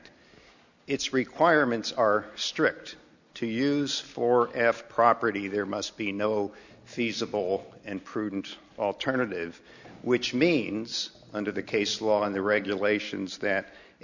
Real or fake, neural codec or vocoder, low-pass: real; none; 7.2 kHz